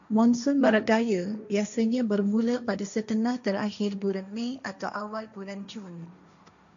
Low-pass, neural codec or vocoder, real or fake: 7.2 kHz; codec, 16 kHz, 1.1 kbps, Voila-Tokenizer; fake